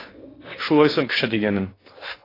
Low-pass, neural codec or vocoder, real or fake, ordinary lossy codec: 5.4 kHz; codec, 16 kHz in and 24 kHz out, 0.6 kbps, FocalCodec, streaming, 2048 codes; fake; AAC, 24 kbps